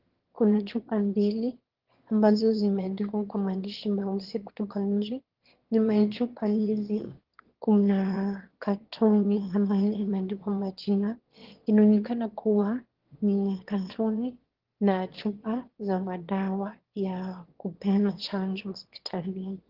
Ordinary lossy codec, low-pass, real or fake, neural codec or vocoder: Opus, 16 kbps; 5.4 kHz; fake; autoencoder, 22.05 kHz, a latent of 192 numbers a frame, VITS, trained on one speaker